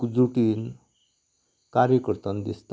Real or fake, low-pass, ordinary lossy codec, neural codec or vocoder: real; none; none; none